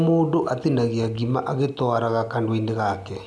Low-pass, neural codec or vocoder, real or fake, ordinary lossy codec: none; none; real; none